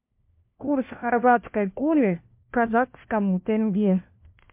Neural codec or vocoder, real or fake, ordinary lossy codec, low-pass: codec, 16 kHz, 1 kbps, FunCodec, trained on LibriTTS, 50 frames a second; fake; MP3, 32 kbps; 3.6 kHz